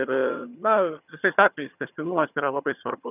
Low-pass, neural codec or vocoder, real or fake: 3.6 kHz; vocoder, 22.05 kHz, 80 mel bands, HiFi-GAN; fake